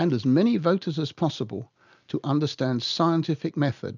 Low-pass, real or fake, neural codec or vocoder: 7.2 kHz; real; none